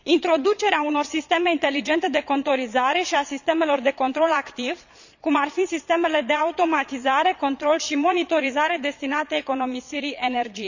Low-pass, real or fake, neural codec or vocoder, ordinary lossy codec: 7.2 kHz; fake; vocoder, 22.05 kHz, 80 mel bands, Vocos; none